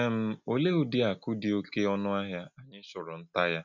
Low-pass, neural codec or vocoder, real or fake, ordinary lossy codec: 7.2 kHz; none; real; none